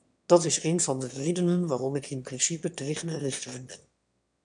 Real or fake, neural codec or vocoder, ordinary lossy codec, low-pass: fake; autoencoder, 22.05 kHz, a latent of 192 numbers a frame, VITS, trained on one speaker; MP3, 96 kbps; 9.9 kHz